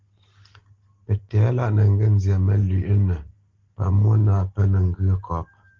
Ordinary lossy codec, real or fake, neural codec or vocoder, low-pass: Opus, 16 kbps; real; none; 7.2 kHz